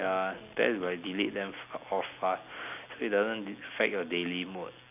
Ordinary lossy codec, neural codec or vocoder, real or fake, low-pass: none; none; real; 3.6 kHz